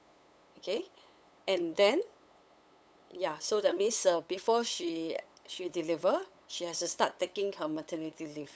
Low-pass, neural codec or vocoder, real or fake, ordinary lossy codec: none; codec, 16 kHz, 8 kbps, FunCodec, trained on LibriTTS, 25 frames a second; fake; none